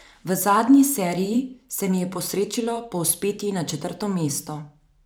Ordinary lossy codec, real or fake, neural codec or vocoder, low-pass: none; fake; vocoder, 44.1 kHz, 128 mel bands every 512 samples, BigVGAN v2; none